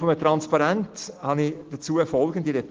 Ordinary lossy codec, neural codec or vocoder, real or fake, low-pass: Opus, 16 kbps; none; real; 7.2 kHz